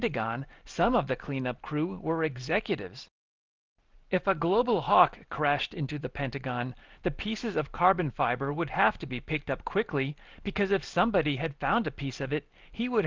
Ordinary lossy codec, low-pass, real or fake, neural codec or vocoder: Opus, 24 kbps; 7.2 kHz; real; none